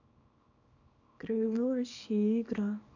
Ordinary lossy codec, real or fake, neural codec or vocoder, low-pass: AAC, 48 kbps; fake; codec, 24 kHz, 0.9 kbps, WavTokenizer, small release; 7.2 kHz